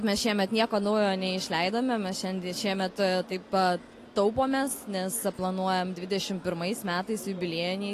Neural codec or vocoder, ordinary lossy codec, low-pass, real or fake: none; AAC, 48 kbps; 14.4 kHz; real